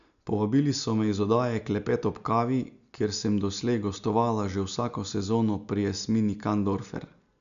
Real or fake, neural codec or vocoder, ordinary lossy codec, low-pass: real; none; none; 7.2 kHz